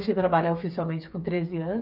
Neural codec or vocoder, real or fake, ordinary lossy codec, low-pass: codec, 16 kHz, 8 kbps, FreqCodec, smaller model; fake; none; 5.4 kHz